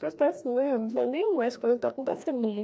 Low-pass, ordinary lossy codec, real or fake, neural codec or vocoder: none; none; fake; codec, 16 kHz, 1 kbps, FreqCodec, larger model